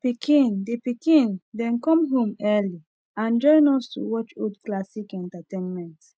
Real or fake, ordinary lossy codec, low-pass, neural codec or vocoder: real; none; none; none